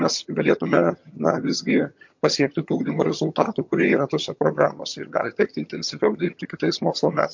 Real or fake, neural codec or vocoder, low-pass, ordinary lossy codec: fake; vocoder, 22.05 kHz, 80 mel bands, HiFi-GAN; 7.2 kHz; MP3, 48 kbps